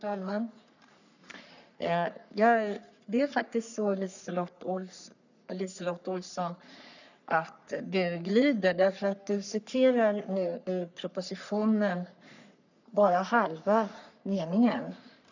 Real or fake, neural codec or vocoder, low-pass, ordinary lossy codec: fake; codec, 44.1 kHz, 3.4 kbps, Pupu-Codec; 7.2 kHz; none